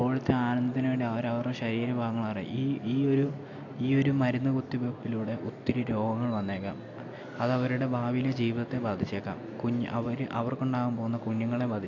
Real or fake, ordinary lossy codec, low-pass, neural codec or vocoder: real; AAC, 48 kbps; 7.2 kHz; none